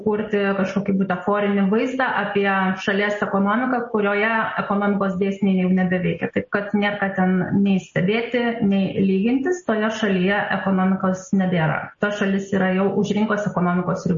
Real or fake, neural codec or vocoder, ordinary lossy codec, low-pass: real; none; MP3, 32 kbps; 7.2 kHz